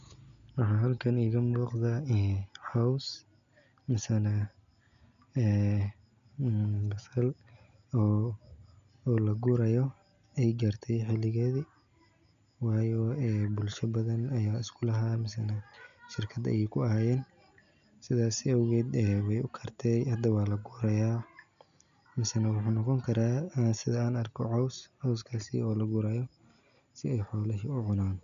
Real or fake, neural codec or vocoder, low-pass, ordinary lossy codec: real; none; 7.2 kHz; none